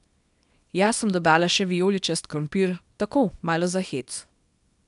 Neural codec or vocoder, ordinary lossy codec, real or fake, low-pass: codec, 24 kHz, 0.9 kbps, WavTokenizer, small release; none; fake; 10.8 kHz